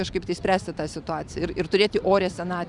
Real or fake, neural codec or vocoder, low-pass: fake; vocoder, 44.1 kHz, 128 mel bands every 512 samples, BigVGAN v2; 10.8 kHz